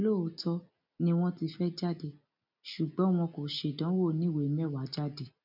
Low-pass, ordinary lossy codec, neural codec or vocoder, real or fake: 5.4 kHz; none; none; real